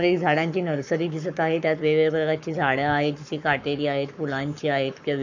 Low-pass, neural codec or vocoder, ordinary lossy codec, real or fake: 7.2 kHz; codec, 44.1 kHz, 7.8 kbps, Pupu-Codec; none; fake